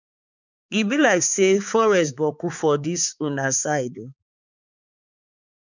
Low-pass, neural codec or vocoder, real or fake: 7.2 kHz; codec, 16 kHz, 4 kbps, X-Codec, HuBERT features, trained on balanced general audio; fake